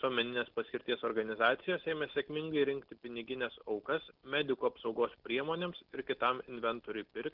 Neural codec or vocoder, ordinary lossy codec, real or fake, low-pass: none; Opus, 16 kbps; real; 5.4 kHz